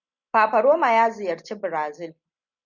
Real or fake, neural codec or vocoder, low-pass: real; none; 7.2 kHz